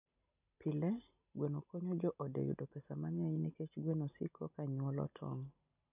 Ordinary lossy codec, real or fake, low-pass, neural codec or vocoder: none; real; 3.6 kHz; none